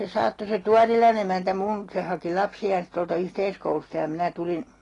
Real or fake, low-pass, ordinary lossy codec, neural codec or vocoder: real; 10.8 kHz; AAC, 32 kbps; none